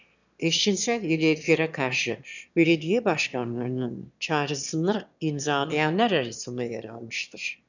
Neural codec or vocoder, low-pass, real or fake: autoencoder, 22.05 kHz, a latent of 192 numbers a frame, VITS, trained on one speaker; 7.2 kHz; fake